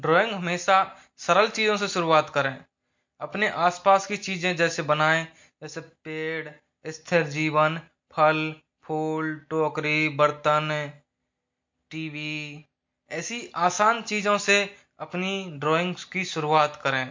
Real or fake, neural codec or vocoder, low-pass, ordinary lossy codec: real; none; 7.2 kHz; MP3, 48 kbps